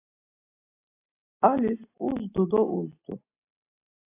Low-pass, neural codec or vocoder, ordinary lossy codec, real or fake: 3.6 kHz; none; AAC, 24 kbps; real